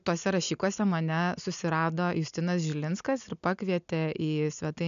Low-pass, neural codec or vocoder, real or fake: 7.2 kHz; none; real